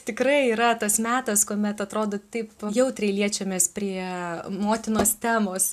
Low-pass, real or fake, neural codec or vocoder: 14.4 kHz; real; none